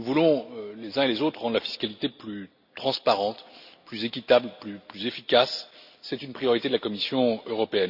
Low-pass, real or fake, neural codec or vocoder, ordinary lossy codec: 5.4 kHz; real; none; none